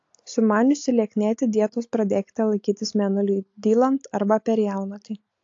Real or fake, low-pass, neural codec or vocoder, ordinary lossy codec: real; 7.2 kHz; none; AAC, 48 kbps